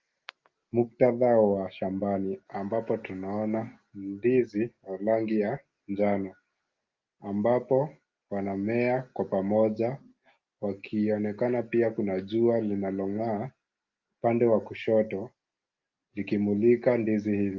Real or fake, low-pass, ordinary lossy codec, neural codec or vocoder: real; 7.2 kHz; Opus, 32 kbps; none